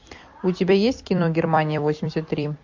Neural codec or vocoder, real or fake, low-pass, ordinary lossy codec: vocoder, 44.1 kHz, 128 mel bands every 256 samples, BigVGAN v2; fake; 7.2 kHz; MP3, 48 kbps